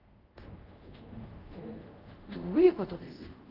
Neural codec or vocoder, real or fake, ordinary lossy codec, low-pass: codec, 24 kHz, 0.5 kbps, DualCodec; fake; Opus, 32 kbps; 5.4 kHz